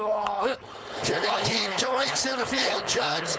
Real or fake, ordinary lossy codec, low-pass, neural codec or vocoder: fake; none; none; codec, 16 kHz, 4.8 kbps, FACodec